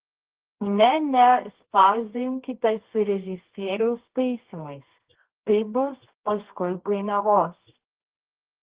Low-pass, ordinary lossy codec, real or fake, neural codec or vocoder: 3.6 kHz; Opus, 16 kbps; fake; codec, 24 kHz, 0.9 kbps, WavTokenizer, medium music audio release